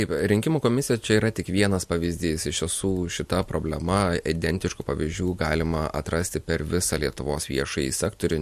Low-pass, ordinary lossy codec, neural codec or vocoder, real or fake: 14.4 kHz; MP3, 64 kbps; none; real